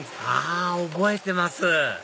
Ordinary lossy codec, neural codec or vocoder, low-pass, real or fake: none; none; none; real